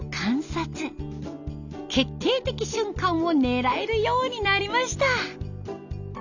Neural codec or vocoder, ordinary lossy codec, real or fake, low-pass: none; none; real; 7.2 kHz